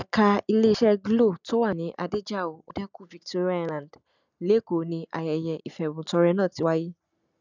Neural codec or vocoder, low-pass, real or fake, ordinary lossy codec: none; 7.2 kHz; real; none